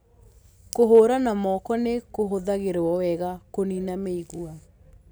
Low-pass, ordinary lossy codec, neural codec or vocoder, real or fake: none; none; none; real